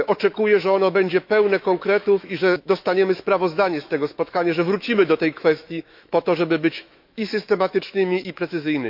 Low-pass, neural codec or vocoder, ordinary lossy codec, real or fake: 5.4 kHz; autoencoder, 48 kHz, 128 numbers a frame, DAC-VAE, trained on Japanese speech; MP3, 48 kbps; fake